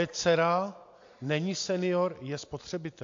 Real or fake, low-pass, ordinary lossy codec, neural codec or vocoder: real; 7.2 kHz; AAC, 48 kbps; none